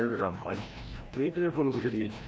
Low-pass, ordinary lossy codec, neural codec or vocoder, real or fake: none; none; codec, 16 kHz, 1 kbps, FreqCodec, larger model; fake